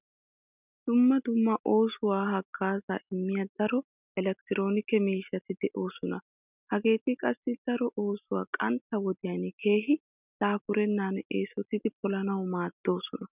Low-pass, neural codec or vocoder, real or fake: 3.6 kHz; none; real